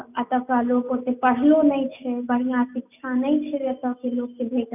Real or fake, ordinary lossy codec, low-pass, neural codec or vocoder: real; none; 3.6 kHz; none